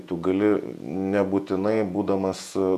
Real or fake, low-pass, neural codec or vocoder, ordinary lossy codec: fake; 14.4 kHz; vocoder, 48 kHz, 128 mel bands, Vocos; AAC, 96 kbps